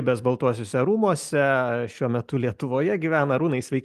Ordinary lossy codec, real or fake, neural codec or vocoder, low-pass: Opus, 32 kbps; real; none; 14.4 kHz